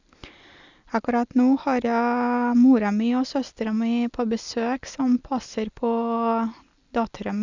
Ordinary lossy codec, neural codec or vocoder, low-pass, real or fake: Opus, 64 kbps; none; 7.2 kHz; real